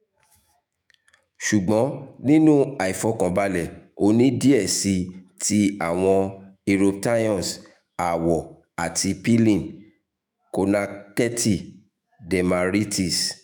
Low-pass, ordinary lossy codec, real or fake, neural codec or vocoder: none; none; fake; autoencoder, 48 kHz, 128 numbers a frame, DAC-VAE, trained on Japanese speech